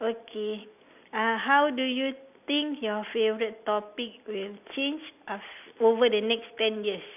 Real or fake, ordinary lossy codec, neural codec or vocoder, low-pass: real; none; none; 3.6 kHz